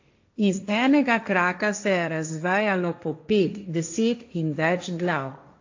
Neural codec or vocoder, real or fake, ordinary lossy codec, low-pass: codec, 16 kHz, 1.1 kbps, Voila-Tokenizer; fake; AAC, 48 kbps; 7.2 kHz